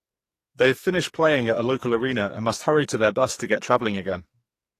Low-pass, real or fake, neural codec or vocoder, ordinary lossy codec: 14.4 kHz; fake; codec, 44.1 kHz, 2.6 kbps, SNAC; AAC, 48 kbps